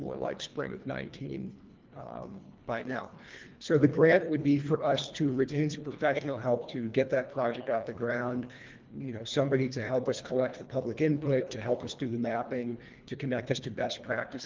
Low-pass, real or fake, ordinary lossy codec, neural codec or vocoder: 7.2 kHz; fake; Opus, 32 kbps; codec, 24 kHz, 1.5 kbps, HILCodec